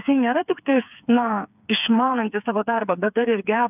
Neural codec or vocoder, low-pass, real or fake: codec, 16 kHz, 4 kbps, FreqCodec, smaller model; 3.6 kHz; fake